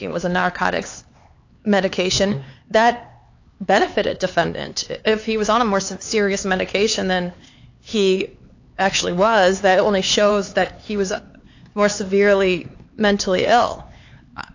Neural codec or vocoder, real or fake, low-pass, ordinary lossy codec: codec, 16 kHz, 4 kbps, X-Codec, HuBERT features, trained on LibriSpeech; fake; 7.2 kHz; AAC, 48 kbps